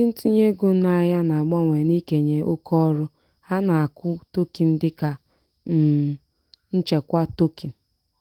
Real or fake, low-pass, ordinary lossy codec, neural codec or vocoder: fake; 19.8 kHz; Opus, 24 kbps; autoencoder, 48 kHz, 128 numbers a frame, DAC-VAE, trained on Japanese speech